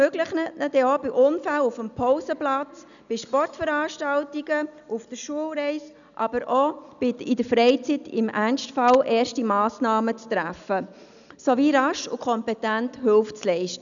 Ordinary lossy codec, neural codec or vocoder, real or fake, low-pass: none; none; real; 7.2 kHz